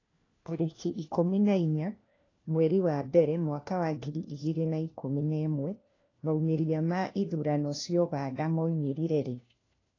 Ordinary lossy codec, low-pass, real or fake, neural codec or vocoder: AAC, 32 kbps; 7.2 kHz; fake; codec, 16 kHz, 1 kbps, FunCodec, trained on LibriTTS, 50 frames a second